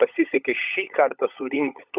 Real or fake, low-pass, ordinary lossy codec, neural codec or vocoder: fake; 3.6 kHz; Opus, 64 kbps; codec, 16 kHz, 16 kbps, FunCodec, trained on LibriTTS, 50 frames a second